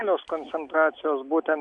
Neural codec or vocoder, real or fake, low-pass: none; real; 9.9 kHz